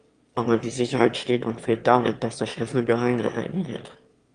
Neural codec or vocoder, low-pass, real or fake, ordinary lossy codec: autoencoder, 22.05 kHz, a latent of 192 numbers a frame, VITS, trained on one speaker; 9.9 kHz; fake; Opus, 32 kbps